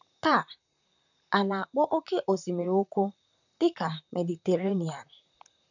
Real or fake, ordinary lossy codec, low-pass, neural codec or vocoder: fake; none; 7.2 kHz; vocoder, 44.1 kHz, 128 mel bands, Pupu-Vocoder